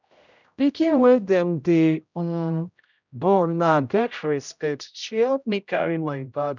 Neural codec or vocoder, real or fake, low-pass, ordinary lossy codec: codec, 16 kHz, 0.5 kbps, X-Codec, HuBERT features, trained on general audio; fake; 7.2 kHz; none